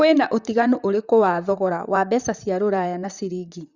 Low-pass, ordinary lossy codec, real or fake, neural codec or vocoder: 7.2 kHz; Opus, 64 kbps; fake; vocoder, 44.1 kHz, 128 mel bands, Pupu-Vocoder